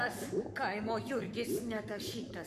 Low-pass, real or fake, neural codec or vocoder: 14.4 kHz; fake; codec, 44.1 kHz, 7.8 kbps, Pupu-Codec